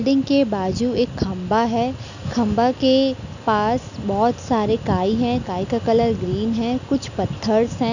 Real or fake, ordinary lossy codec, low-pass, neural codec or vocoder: real; none; 7.2 kHz; none